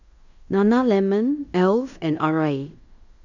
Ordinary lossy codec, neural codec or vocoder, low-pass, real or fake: none; codec, 16 kHz in and 24 kHz out, 0.9 kbps, LongCat-Audio-Codec, fine tuned four codebook decoder; 7.2 kHz; fake